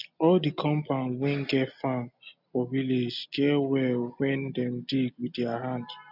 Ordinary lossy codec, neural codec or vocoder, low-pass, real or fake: none; none; 5.4 kHz; real